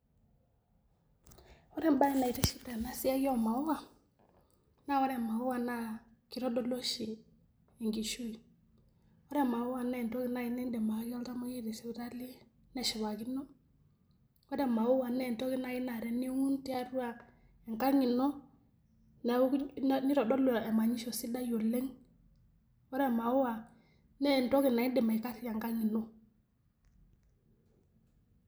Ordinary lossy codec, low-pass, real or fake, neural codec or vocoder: none; none; real; none